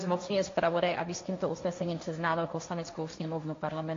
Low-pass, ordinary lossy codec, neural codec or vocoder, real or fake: 7.2 kHz; AAC, 48 kbps; codec, 16 kHz, 1.1 kbps, Voila-Tokenizer; fake